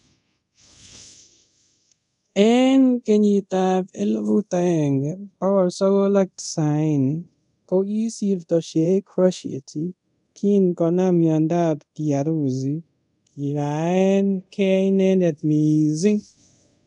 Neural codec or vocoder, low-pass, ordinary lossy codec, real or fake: codec, 24 kHz, 0.5 kbps, DualCodec; 10.8 kHz; none; fake